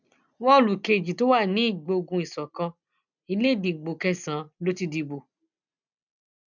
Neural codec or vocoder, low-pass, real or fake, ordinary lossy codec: none; 7.2 kHz; real; none